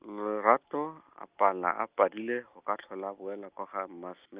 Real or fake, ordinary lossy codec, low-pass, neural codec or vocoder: real; Opus, 32 kbps; 3.6 kHz; none